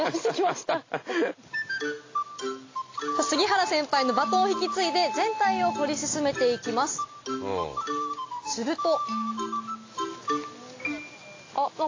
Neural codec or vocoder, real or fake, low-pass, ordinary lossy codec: none; real; 7.2 kHz; AAC, 32 kbps